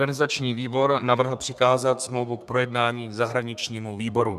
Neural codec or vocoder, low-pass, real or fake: codec, 32 kHz, 1.9 kbps, SNAC; 14.4 kHz; fake